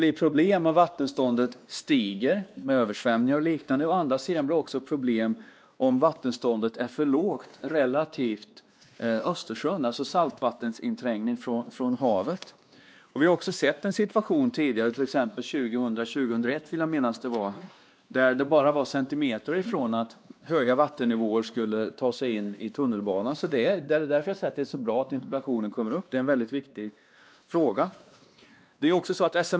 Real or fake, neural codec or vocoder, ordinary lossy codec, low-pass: fake; codec, 16 kHz, 2 kbps, X-Codec, WavLM features, trained on Multilingual LibriSpeech; none; none